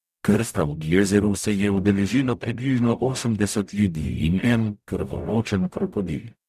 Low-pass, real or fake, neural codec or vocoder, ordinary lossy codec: 14.4 kHz; fake; codec, 44.1 kHz, 0.9 kbps, DAC; none